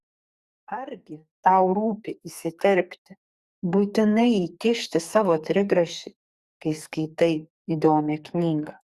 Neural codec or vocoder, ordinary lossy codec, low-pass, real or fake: codec, 44.1 kHz, 2.6 kbps, SNAC; Opus, 64 kbps; 14.4 kHz; fake